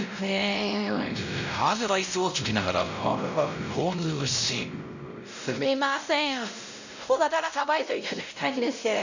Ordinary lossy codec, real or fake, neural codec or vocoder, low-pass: none; fake; codec, 16 kHz, 0.5 kbps, X-Codec, WavLM features, trained on Multilingual LibriSpeech; 7.2 kHz